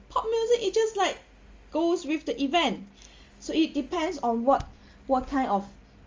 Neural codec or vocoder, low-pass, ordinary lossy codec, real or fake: none; 7.2 kHz; Opus, 32 kbps; real